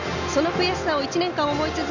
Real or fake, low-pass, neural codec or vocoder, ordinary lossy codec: real; 7.2 kHz; none; none